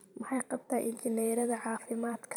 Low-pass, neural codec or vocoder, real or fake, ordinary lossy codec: none; vocoder, 44.1 kHz, 128 mel bands every 512 samples, BigVGAN v2; fake; none